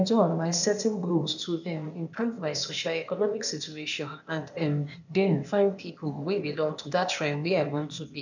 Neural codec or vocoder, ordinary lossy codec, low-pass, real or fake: codec, 16 kHz, 0.8 kbps, ZipCodec; none; 7.2 kHz; fake